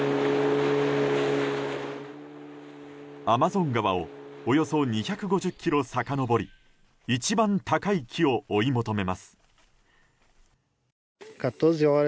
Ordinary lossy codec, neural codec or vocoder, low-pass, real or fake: none; none; none; real